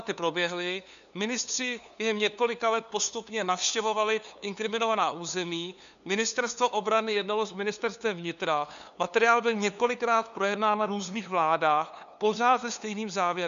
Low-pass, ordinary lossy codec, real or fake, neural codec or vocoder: 7.2 kHz; MP3, 96 kbps; fake; codec, 16 kHz, 2 kbps, FunCodec, trained on LibriTTS, 25 frames a second